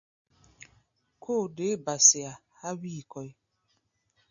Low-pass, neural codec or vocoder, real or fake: 7.2 kHz; none; real